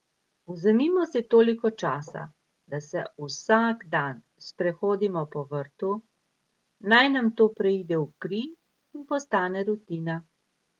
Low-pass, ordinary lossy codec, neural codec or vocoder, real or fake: 19.8 kHz; Opus, 24 kbps; none; real